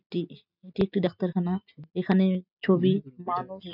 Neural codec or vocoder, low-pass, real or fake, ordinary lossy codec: none; 5.4 kHz; real; none